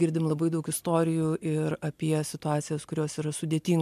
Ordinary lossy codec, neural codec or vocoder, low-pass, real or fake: MP3, 96 kbps; none; 14.4 kHz; real